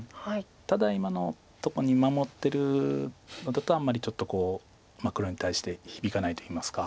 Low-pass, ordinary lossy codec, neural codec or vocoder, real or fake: none; none; none; real